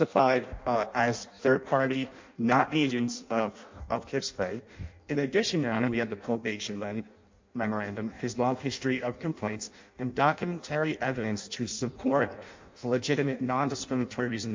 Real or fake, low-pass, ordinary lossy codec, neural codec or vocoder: fake; 7.2 kHz; MP3, 48 kbps; codec, 16 kHz in and 24 kHz out, 0.6 kbps, FireRedTTS-2 codec